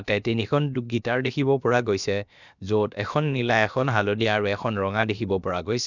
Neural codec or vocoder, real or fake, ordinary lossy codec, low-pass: codec, 16 kHz, about 1 kbps, DyCAST, with the encoder's durations; fake; none; 7.2 kHz